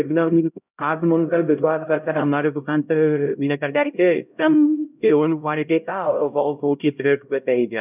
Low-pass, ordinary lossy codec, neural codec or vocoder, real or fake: 3.6 kHz; none; codec, 16 kHz, 0.5 kbps, X-Codec, HuBERT features, trained on LibriSpeech; fake